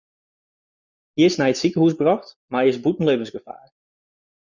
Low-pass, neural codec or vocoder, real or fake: 7.2 kHz; none; real